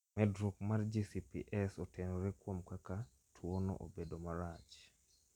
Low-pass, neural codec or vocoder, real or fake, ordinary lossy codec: 19.8 kHz; none; real; none